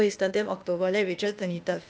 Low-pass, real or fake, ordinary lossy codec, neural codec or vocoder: none; fake; none; codec, 16 kHz, 0.8 kbps, ZipCodec